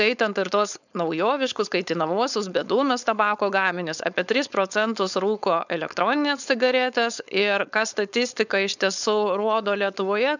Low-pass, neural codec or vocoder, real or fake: 7.2 kHz; codec, 16 kHz, 4.8 kbps, FACodec; fake